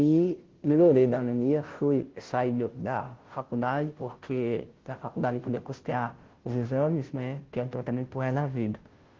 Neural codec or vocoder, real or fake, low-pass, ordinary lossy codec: codec, 16 kHz, 0.5 kbps, FunCodec, trained on Chinese and English, 25 frames a second; fake; 7.2 kHz; Opus, 16 kbps